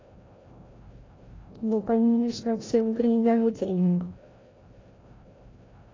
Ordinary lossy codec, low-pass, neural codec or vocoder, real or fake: AAC, 32 kbps; 7.2 kHz; codec, 16 kHz, 0.5 kbps, FreqCodec, larger model; fake